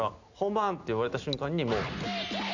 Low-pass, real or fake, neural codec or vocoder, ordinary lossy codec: 7.2 kHz; real; none; none